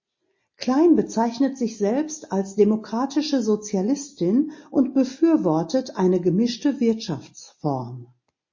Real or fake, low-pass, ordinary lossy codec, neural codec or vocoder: real; 7.2 kHz; MP3, 32 kbps; none